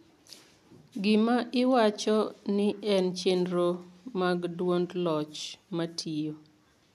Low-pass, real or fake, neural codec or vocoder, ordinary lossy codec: 14.4 kHz; real; none; none